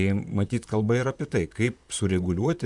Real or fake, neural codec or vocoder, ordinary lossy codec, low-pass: fake; vocoder, 44.1 kHz, 128 mel bands, Pupu-Vocoder; MP3, 96 kbps; 10.8 kHz